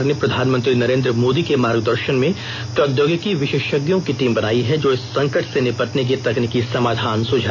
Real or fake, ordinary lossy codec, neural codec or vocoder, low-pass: real; none; none; 7.2 kHz